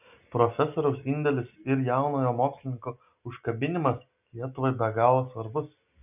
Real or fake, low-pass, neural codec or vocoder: real; 3.6 kHz; none